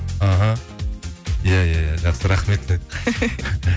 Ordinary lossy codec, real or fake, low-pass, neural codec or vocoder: none; real; none; none